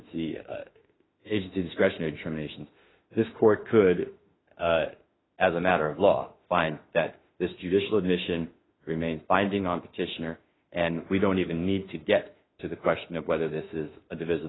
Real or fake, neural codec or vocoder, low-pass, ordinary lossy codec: fake; autoencoder, 48 kHz, 32 numbers a frame, DAC-VAE, trained on Japanese speech; 7.2 kHz; AAC, 16 kbps